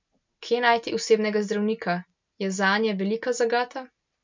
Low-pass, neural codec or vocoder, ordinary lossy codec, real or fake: 7.2 kHz; none; MP3, 64 kbps; real